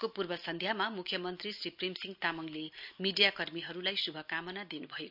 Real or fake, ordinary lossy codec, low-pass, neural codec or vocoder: real; none; 5.4 kHz; none